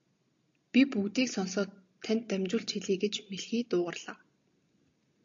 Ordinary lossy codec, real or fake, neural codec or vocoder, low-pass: AAC, 48 kbps; real; none; 7.2 kHz